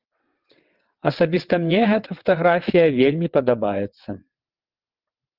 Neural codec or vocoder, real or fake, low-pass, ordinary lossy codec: vocoder, 22.05 kHz, 80 mel bands, WaveNeXt; fake; 5.4 kHz; Opus, 32 kbps